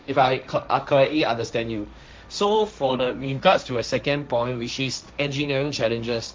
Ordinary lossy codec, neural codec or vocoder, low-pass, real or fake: none; codec, 16 kHz, 1.1 kbps, Voila-Tokenizer; none; fake